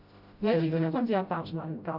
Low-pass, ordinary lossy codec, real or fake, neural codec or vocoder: 5.4 kHz; Opus, 64 kbps; fake; codec, 16 kHz, 0.5 kbps, FreqCodec, smaller model